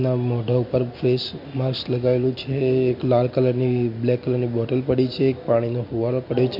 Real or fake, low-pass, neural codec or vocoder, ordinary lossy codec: real; 5.4 kHz; none; MP3, 32 kbps